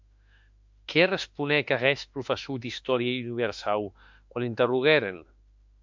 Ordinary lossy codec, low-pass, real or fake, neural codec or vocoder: MP3, 64 kbps; 7.2 kHz; fake; autoencoder, 48 kHz, 32 numbers a frame, DAC-VAE, trained on Japanese speech